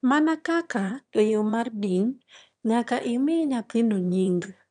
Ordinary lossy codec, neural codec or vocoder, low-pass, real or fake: none; autoencoder, 22.05 kHz, a latent of 192 numbers a frame, VITS, trained on one speaker; 9.9 kHz; fake